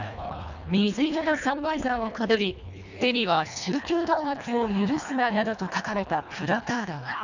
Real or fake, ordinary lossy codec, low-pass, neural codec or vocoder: fake; none; 7.2 kHz; codec, 24 kHz, 1.5 kbps, HILCodec